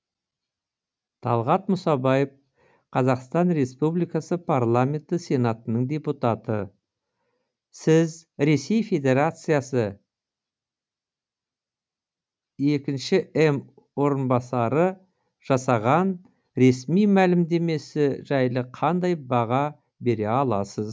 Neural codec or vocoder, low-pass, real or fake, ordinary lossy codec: none; none; real; none